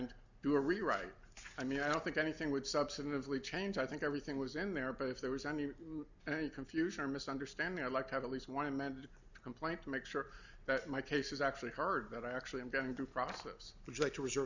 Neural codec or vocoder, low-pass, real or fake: none; 7.2 kHz; real